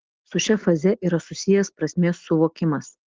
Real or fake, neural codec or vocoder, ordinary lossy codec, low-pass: real; none; Opus, 16 kbps; 7.2 kHz